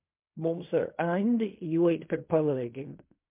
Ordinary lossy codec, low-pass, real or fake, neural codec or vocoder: MP3, 32 kbps; 3.6 kHz; fake; codec, 16 kHz in and 24 kHz out, 0.4 kbps, LongCat-Audio-Codec, fine tuned four codebook decoder